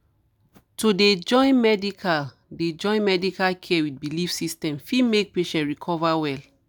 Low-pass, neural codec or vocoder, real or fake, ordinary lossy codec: none; none; real; none